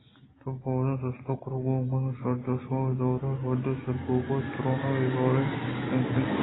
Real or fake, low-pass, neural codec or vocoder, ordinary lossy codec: real; 7.2 kHz; none; AAC, 16 kbps